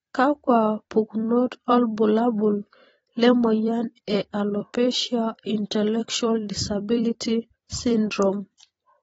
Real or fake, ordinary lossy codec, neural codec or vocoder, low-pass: fake; AAC, 24 kbps; vocoder, 44.1 kHz, 128 mel bands every 256 samples, BigVGAN v2; 19.8 kHz